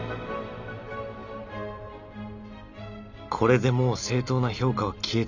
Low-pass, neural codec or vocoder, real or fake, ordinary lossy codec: 7.2 kHz; none; real; none